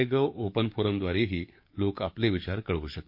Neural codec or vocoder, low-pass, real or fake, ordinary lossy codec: codec, 44.1 kHz, 7.8 kbps, DAC; 5.4 kHz; fake; MP3, 32 kbps